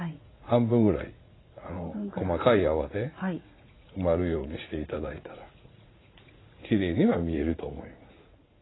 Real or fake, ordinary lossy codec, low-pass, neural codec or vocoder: fake; AAC, 16 kbps; 7.2 kHz; vocoder, 44.1 kHz, 80 mel bands, Vocos